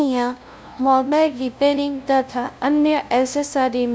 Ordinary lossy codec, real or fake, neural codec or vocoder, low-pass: none; fake; codec, 16 kHz, 0.5 kbps, FunCodec, trained on LibriTTS, 25 frames a second; none